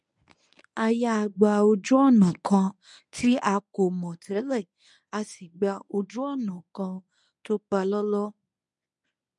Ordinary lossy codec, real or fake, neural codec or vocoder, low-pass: none; fake; codec, 24 kHz, 0.9 kbps, WavTokenizer, medium speech release version 1; 10.8 kHz